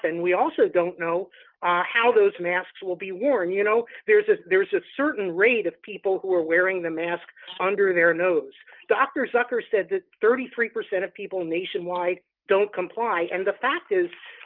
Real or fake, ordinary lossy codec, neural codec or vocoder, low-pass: real; Opus, 32 kbps; none; 5.4 kHz